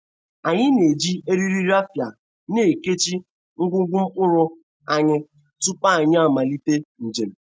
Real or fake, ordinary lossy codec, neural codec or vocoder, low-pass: real; none; none; none